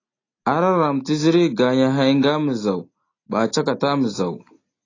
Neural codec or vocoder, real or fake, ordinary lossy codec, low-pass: none; real; AAC, 32 kbps; 7.2 kHz